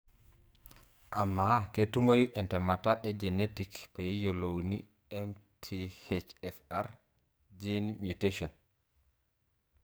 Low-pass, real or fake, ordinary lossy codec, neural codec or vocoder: none; fake; none; codec, 44.1 kHz, 2.6 kbps, SNAC